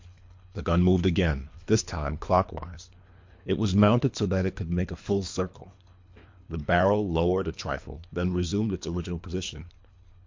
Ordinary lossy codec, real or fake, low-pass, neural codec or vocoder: MP3, 48 kbps; fake; 7.2 kHz; codec, 24 kHz, 3 kbps, HILCodec